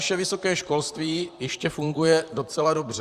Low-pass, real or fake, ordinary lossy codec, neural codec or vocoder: 14.4 kHz; fake; Opus, 64 kbps; vocoder, 44.1 kHz, 128 mel bands, Pupu-Vocoder